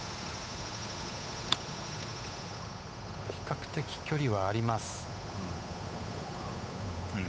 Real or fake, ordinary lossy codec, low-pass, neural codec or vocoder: fake; none; none; codec, 16 kHz, 8 kbps, FunCodec, trained on Chinese and English, 25 frames a second